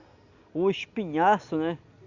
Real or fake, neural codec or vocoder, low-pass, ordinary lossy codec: real; none; 7.2 kHz; Opus, 64 kbps